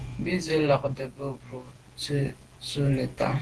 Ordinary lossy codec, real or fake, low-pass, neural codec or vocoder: Opus, 16 kbps; fake; 10.8 kHz; vocoder, 48 kHz, 128 mel bands, Vocos